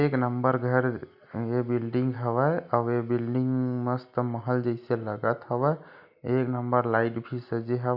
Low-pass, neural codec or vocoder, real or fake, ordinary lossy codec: 5.4 kHz; none; real; none